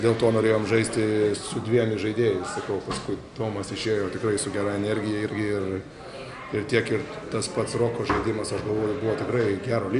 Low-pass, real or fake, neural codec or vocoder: 10.8 kHz; real; none